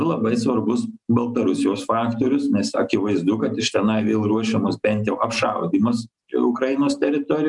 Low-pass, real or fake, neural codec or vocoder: 10.8 kHz; real; none